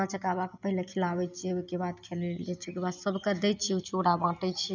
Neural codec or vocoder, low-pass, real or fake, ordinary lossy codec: none; 7.2 kHz; real; none